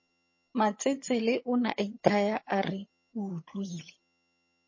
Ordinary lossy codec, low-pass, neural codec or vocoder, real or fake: MP3, 32 kbps; 7.2 kHz; vocoder, 22.05 kHz, 80 mel bands, HiFi-GAN; fake